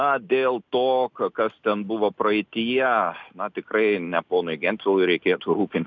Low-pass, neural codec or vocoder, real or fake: 7.2 kHz; none; real